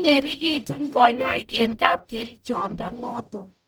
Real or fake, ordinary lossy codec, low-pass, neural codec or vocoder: fake; none; none; codec, 44.1 kHz, 0.9 kbps, DAC